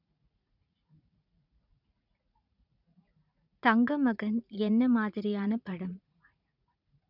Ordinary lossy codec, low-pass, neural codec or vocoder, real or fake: none; 5.4 kHz; vocoder, 44.1 kHz, 80 mel bands, Vocos; fake